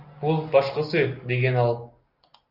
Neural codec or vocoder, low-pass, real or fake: none; 5.4 kHz; real